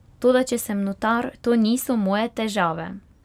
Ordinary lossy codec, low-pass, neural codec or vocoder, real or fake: none; 19.8 kHz; none; real